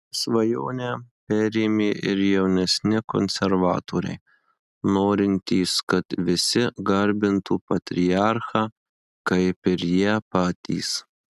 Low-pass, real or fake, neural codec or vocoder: 14.4 kHz; real; none